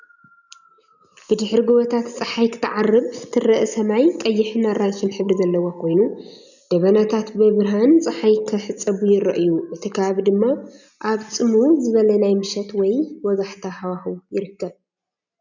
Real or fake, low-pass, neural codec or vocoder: real; 7.2 kHz; none